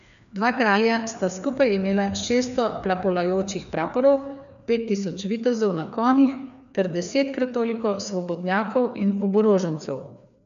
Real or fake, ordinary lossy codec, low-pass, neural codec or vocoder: fake; none; 7.2 kHz; codec, 16 kHz, 2 kbps, FreqCodec, larger model